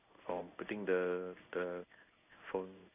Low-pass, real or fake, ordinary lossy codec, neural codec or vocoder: 3.6 kHz; fake; none; codec, 16 kHz in and 24 kHz out, 1 kbps, XY-Tokenizer